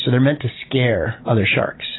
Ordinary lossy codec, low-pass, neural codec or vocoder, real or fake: AAC, 16 kbps; 7.2 kHz; none; real